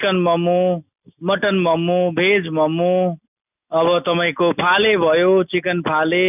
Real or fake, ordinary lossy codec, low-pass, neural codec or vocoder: real; none; 3.6 kHz; none